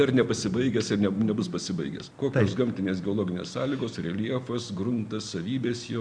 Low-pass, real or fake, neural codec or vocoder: 9.9 kHz; real; none